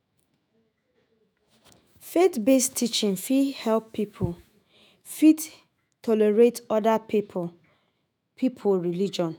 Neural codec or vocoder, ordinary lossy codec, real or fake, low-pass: autoencoder, 48 kHz, 128 numbers a frame, DAC-VAE, trained on Japanese speech; none; fake; none